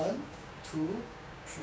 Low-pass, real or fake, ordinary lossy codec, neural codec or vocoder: none; fake; none; codec, 16 kHz, 6 kbps, DAC